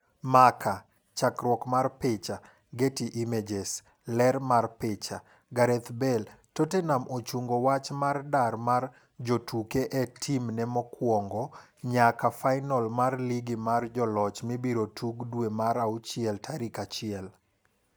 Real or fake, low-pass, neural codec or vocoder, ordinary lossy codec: real; none; none; none